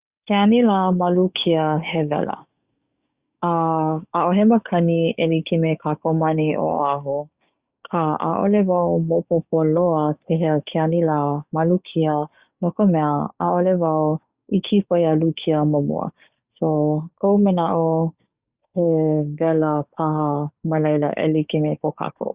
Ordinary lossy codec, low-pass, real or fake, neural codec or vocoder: Opus, 64 kbps; 3.6 kHz; fake; codec, 44.1 kHz, 7.8 kbps, DAC